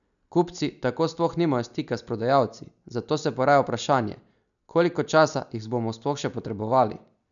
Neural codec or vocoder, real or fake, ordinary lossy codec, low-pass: none; real; none; 7.2 kHz